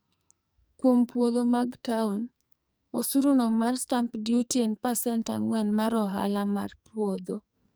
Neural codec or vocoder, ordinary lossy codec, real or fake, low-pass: codec, 44.1 kHz, 2.6 kbps, SNAC; none; fake; none